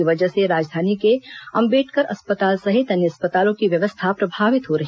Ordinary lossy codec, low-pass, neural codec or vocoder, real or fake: none; none; none; real